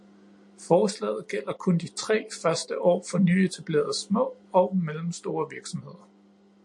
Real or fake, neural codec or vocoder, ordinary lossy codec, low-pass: real; none; MP3, 48 kbps; 10.8 kHz